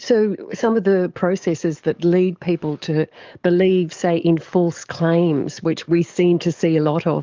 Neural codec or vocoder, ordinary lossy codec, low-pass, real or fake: codec, 44.1 kHz, 7.8 kbps, DAC; Opus, 24 kbps; 7.2 kHz; fake